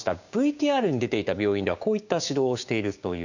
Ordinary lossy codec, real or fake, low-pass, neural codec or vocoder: none; fake; 7.2 kHz; codec, 16 kHz, 6 kbps, DAC